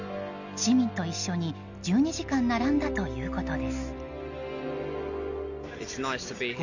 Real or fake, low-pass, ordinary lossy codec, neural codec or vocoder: real; 7.2 kHz; none; none